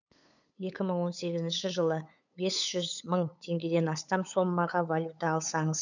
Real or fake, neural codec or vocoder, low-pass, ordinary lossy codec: fake; codec, 16 kHz, 8 kbps, FunCodec, trained on LibriTTS, 25 frames a second; 7.2 kHz; none